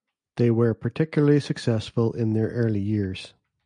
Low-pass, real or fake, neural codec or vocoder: 9.9 kHz; real; none